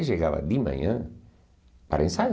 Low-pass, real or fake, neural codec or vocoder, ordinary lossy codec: none; real; none; none